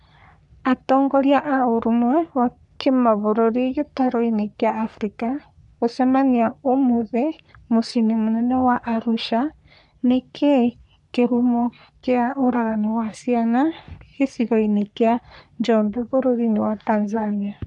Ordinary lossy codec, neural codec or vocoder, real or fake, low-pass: none; codec, 44.1 kHz, 3.4 kbps, Pupu-Codec; fake; 10.8 kHz